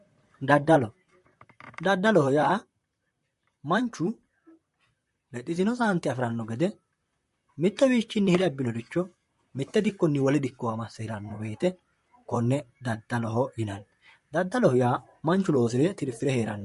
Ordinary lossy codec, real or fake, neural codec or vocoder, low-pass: MP3, 48 kbps; fake; vocoder, 44.1 kHz, 128 mel bands, Pupu-Vocoder; 14.4 kHz